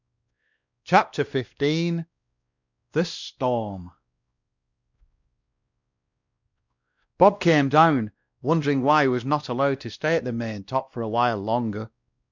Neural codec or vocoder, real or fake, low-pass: codec, 16 kHz, 1 kbps, X-Codec, WavLM features, trained on Multilingual LibriSpeech; fake; 7.2 kHz